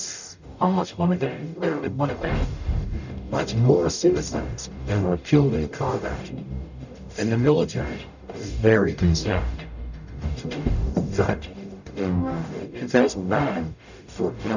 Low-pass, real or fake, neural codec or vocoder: 7.2 kHz; fake; codec, 44.1 kHz, 0.9 kbps, DAC